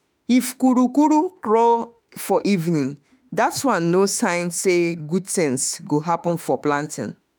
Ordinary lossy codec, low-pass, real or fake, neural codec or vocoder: none; none; fake; autoencoder, 48 kHz, 32 numbers a frame, DAC-VAE, trained on Japanese speech